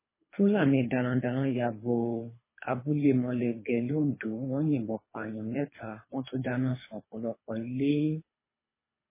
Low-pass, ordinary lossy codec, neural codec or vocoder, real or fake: 3.6 kHz; MP3, 16 kbps; codec, 24 kHz, 3 kbps, HILCodec; fake